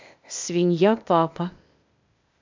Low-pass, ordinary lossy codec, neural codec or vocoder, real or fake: 7.2 kHz; MP3, 64 kbps; codec, 16 kHz, 0.8 kbps, ZipCodec; fake